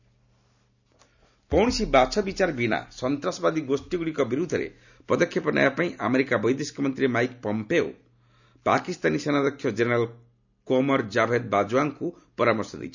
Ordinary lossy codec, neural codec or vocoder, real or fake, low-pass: none; none; real; 7.2 kHz